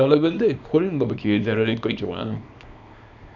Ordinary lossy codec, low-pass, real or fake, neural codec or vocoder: none; 7.2 kHz; fake; codec, 24 kHz, 0.9 kbps, WavTokenizer, small release